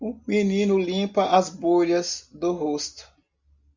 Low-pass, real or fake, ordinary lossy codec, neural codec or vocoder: 7.2 kHz; real; Opus, 64 kbps; none